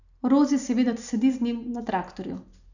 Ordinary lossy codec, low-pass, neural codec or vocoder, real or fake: none; 7.2 kHz; none; real